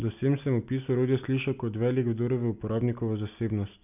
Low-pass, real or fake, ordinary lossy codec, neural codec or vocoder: 3.6 kHz; real; none; none